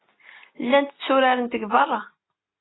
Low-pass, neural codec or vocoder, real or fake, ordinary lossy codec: 7.2 kHz; none; real; AAC, 16 kbps